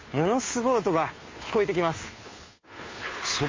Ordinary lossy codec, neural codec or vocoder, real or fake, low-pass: MP3, 32 kbps; codec, 16 kHz, 2 kbps, FunCodec, trained on Chinese and English, 25 frames a second; fake; 7.2 kHz